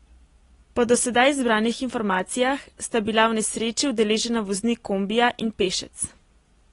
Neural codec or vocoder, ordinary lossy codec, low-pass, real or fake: none; AAC, 32 kbps; 10.8 kHz; real